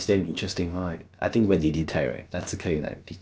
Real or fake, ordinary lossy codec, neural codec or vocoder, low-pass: fake; none; codec, 16 kHz, about 1 kbps, DyCAST, with the encoder's durations; none